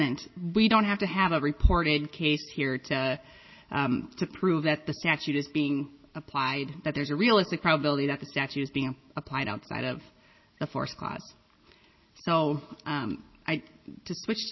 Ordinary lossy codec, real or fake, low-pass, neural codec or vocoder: MP3, 24 kbps; real; 7.2 kHz; none